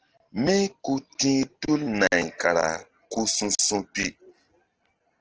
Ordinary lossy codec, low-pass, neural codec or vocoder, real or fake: Opus, 16 kbps; 7.2 kHz; none; real